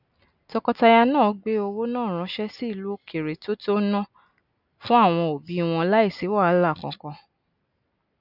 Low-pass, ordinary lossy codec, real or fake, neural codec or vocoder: 5.4 kHz; none; real; none